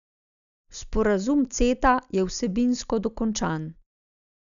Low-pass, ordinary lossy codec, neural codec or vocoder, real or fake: 7.2 kHz; none; none; real